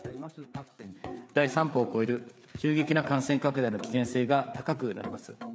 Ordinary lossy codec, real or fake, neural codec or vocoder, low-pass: none; fake; codec, 16 kHz, 4 kbps, FreqCodec, larger model; none